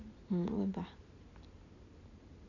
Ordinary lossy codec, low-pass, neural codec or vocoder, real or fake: none; 7.2 kHz; none; real